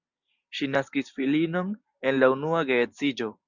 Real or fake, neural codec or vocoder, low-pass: real; none; 7.2 kHz